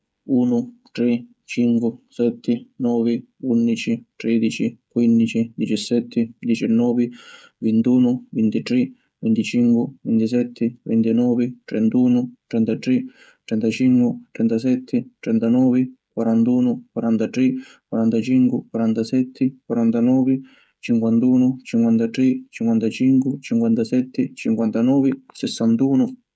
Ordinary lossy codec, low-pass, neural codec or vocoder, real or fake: none; none; codec, 16 kHz, 16 kbps, FreqCodec, smaller model; fake